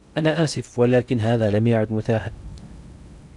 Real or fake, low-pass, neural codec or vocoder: fake; 10.8 kHz; codec, 16 kHz in and 24 kHz out, 0.6 kbps, FocalCodec, streaming, 2048 codes